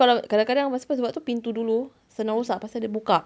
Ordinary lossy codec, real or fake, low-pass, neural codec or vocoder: none; real; none; none